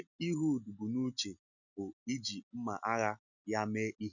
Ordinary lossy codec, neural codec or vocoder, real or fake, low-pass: none; none; real; 7.2 kHz